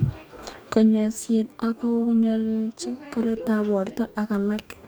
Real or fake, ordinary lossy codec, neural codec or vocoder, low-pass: fake; none; codec, 44.1 kHz, 2.6 kbps, DAC; none